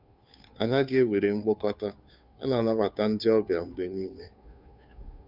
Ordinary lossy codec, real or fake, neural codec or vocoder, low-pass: none; fake; codec, 16 kHz, 2 kbps, FunCodec, trained on Chinese and English, 25 frames a second; 5.4 kHz